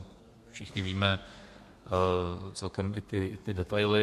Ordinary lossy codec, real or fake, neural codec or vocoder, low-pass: MP3, 64 kbps; fake; codec, 32 kHz, 1.9 kbps, SNAC; 14.4 kHz